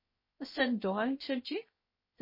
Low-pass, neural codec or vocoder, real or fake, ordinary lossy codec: 5.4 kHz; codec, 16 kHz, 0.3 kbps, FocalCodec; fake; MP3, 24 kbps